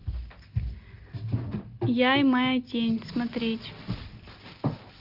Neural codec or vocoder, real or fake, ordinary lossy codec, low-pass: none; real; Opus, 24 kbps; 5.4 kHz